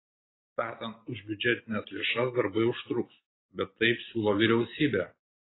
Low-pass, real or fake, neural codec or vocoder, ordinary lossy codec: 7.2 kHz; real; none; AAC, 16 kbps